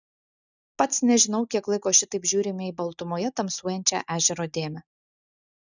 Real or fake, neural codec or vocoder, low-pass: real; none; 7.2 kHz